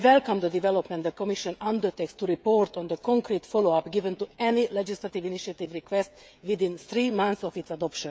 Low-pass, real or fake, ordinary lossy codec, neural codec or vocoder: none; fake; none; codec, 16 kHz, 16 kbps, FreqCodec, smaller model